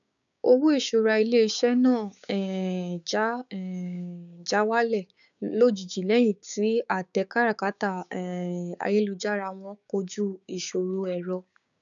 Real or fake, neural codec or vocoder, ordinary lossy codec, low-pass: fake; codec, 16 kHz, 6 kbps, DAC; none; 7.2 kHz